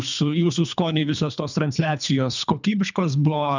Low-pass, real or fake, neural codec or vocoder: 7.2 kHz; fake; codec, 24 kHz, 3 kbps, HILCodec